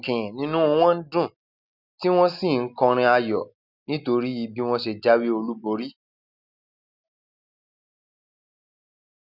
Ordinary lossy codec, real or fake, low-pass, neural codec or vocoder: none; real; 5.4 kHz; none